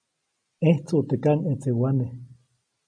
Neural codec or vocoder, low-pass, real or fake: none; 9.9 kHz; real